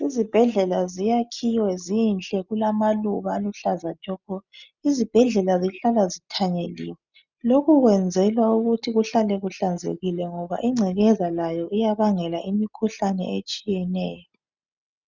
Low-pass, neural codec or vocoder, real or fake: 7.2 kHz; none; real